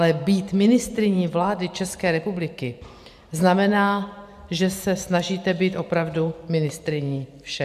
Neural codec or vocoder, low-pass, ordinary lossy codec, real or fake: vocoder, 44.1 kHz, 128 mel bands every 512 samples, BigVGAN v2; 14.4 kHz; AAC, 96 kbps; fake